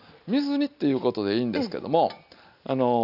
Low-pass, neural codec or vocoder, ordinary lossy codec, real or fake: 5.4 kHz; none; none; real